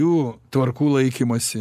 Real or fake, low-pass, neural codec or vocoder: real; 14.4 kHz; none